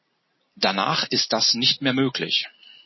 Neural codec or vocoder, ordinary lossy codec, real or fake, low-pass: none; MP3, 24 kbps; real; 7.2 kHz